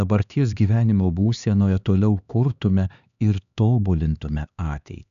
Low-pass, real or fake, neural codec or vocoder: 7.2 kHz; fake; codec, 16 kHz, 2 kbps, X-Codec, HuBERT features, trained on LibriSpeech